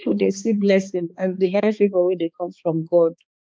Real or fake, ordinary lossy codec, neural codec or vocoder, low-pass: fake; none; codec, 16 kHz, 2 kbps, X-Codec, HuBERT features, trained on balanced general audio; none